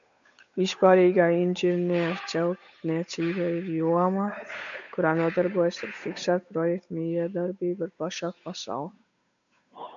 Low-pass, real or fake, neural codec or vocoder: 7.2 kHz; fake; codec, 16 kHz, 8 kbps, FunCodec, trained on Chinese and English, 25 frames a second